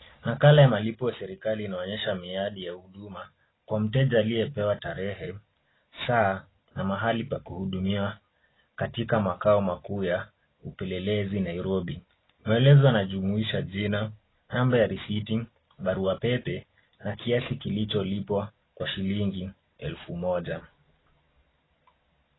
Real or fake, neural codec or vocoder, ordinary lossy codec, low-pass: real; none; AAC, 16 kbps; 7.2 kHz